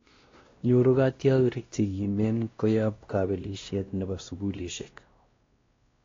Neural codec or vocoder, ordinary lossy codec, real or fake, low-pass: codec, 16 kHz, 1 kbps, X-Codec, WavLM features, trained on Multilingual LibriSpeech; AAC, 32 kbps; fake; 7.2 kHz